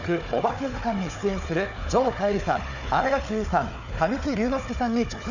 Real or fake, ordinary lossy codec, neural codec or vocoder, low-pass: fake; none; codec, 16 kHz, 4 kbps, FunCodec, trained on Chinese and English, 50 frames a second; 7.2 kHz